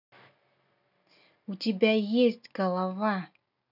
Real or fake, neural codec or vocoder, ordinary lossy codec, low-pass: real; none; none; 5.4 kHz